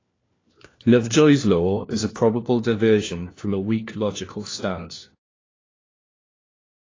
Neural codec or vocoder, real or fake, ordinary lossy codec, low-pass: codec, 16 kHz, 1 kbps, FunCodec, trained on LibriTTS, 50 frames a second; fake; AAC, 32 kbps; 7.2 kHz